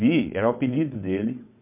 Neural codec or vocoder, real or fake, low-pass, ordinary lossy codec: vocoder, 22.05 kHz, 80 mel bands, WaveNeXt; fake; 3.6 kHz; MP3, 32 kbps